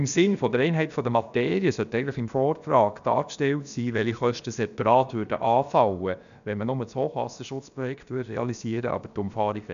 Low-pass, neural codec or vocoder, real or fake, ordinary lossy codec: 7.2 kHz; codec, 16 kHz, about 1 kbps, DyCAST, with the encoder's durations; fake; none